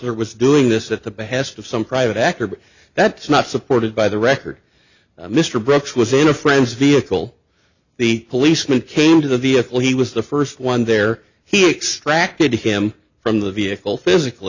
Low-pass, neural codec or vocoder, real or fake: 7.2 kHz; none; real